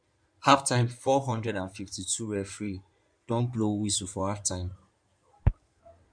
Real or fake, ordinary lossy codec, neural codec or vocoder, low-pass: fake; none; codec, 16 kHz in and 24 kHz out, 2.2 kbps, FireRedTTS-2 codec; 9.9 kHz